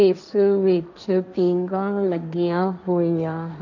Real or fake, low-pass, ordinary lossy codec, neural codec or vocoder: fake; 7.2 kHz; none; codec, 16 kHz, 1.1 kbps, Voila-Tokenizer